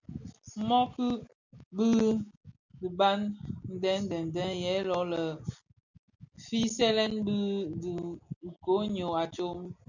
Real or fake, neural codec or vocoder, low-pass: real; none; 7.2 kHz